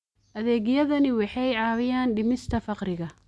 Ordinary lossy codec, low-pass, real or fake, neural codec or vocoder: none; none; real; none